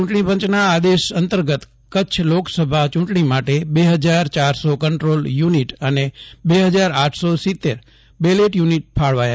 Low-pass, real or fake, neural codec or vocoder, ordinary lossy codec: none; real; none; none